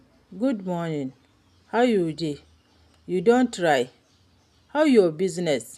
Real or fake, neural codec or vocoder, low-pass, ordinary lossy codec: real; none; 14.4 kHz; none